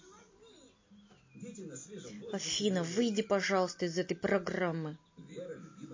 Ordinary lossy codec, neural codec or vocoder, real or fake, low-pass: MP3, 32 kbps; none; real; 7.2 kHz